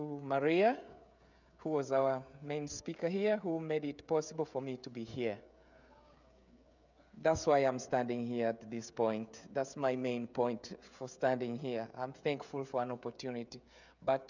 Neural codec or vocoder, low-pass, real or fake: codec, 16 kHz, 16 kbps, FreqCodec, smaller model; 7.2 kHz; fake